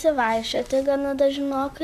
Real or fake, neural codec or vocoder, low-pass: fake; vocoder, 44.1 kHz, 128 mel bands, Pupu-Vocoder; 14.4 kHz